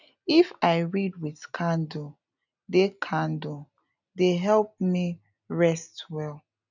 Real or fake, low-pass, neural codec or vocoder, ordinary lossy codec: real; 7.2 kHz; none; none